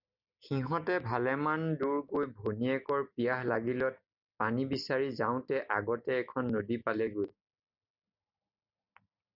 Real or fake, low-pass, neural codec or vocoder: real; 5.4 kHz; none